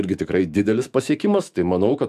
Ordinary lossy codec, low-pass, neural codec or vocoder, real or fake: AAC, 96 kbps; 14.4 kHz; autoencoder, 48 kHz, 128 numbers a frame, DAC-VAE, trained on Japanese speech; fake